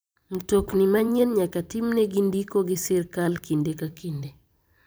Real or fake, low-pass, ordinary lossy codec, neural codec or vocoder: fake; none; none; vocoder, 44.1 kHz, 128 mel bands every 512 samples, BigVGAN v2